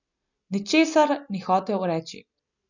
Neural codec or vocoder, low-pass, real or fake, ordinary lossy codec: none; 7.2 kHz; real; none